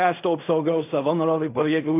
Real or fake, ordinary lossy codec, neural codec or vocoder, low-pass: fake; AAC, 24 kbps; codec, 16 kHz in and 24 kHz out, 0.4 kbps, LongCat-Audio-Codec, fine tuned four codebook decoder; 3.6 kHz